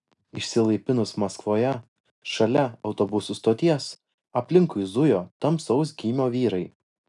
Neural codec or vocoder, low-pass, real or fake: none; 10.8 kHz; real